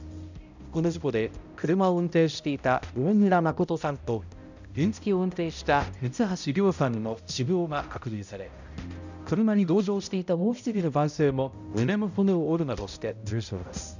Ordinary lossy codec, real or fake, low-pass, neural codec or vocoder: none; fake; 7.2 kHz; codec, 16 kHz, 0.5 kbps, X-Codec, HuBERT features, trained on balanced general audio